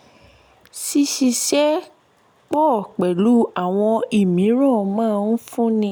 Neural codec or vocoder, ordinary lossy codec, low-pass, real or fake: none; none; none; real